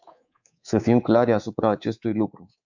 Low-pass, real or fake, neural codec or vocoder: 7.2 kHz; fake; codec, 24 kHz, 3.1 kbps, DualCodec